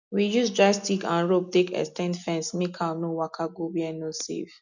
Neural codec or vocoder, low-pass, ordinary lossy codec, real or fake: none; 7.2 kHz; none; real